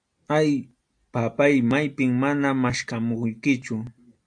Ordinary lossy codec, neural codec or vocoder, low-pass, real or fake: AAC, 64 kbps; none; 9.9 kHz; real